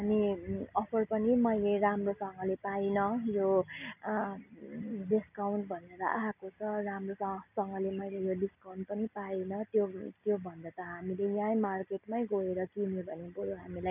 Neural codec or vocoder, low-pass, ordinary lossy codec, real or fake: none; 3.6 kHz; none; real